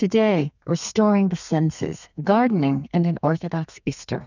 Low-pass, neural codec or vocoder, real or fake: 7.2 kHz; codec, 32 kHz, 1.9 kbps, SNAC; fake